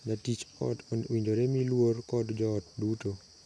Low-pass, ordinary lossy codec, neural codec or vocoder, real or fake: none; none; none; real